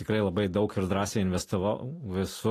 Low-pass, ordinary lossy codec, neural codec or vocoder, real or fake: 14.4 kHz; AAC, 48 kbps; none; real